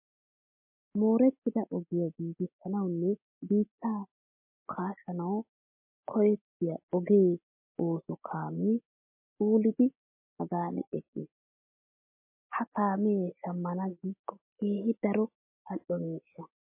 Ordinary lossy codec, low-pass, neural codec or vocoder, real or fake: MP3, 24 kbps; 3.6 kHz; none; real